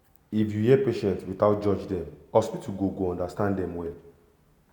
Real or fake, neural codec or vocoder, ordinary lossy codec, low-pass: real; none; none; 19.8 kHz